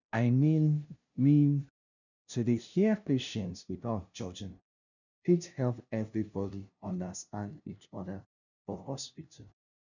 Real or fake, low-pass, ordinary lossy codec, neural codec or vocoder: fake; 7.2 kHz; none; codec, 16 kHz, 0.5 kbps, FunCodec, trained on LibriTTS, 25 frames a second